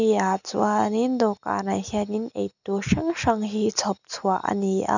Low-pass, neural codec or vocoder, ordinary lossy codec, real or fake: 7.2 kHz; none; none; real